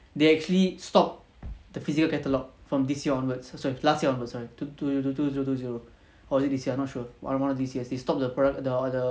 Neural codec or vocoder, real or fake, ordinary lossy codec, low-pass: none; real; none; none